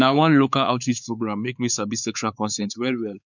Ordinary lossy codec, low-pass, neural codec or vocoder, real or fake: none; 7.2 kHz; codec, 16 kHz, 4 kbps, X-Codec, WavLM features, trained on Multilingual LibriSpeech; fake